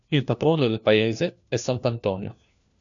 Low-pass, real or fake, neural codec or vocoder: 7.2 kHz; fake; codec, 16 kHz, 1 kbps, FunCodec, trained on LibriTTS, 50 frames a second